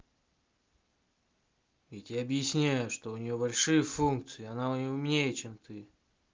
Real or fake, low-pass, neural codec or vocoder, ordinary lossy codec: real; 7.2 kHz; none; Opus, 32 kbps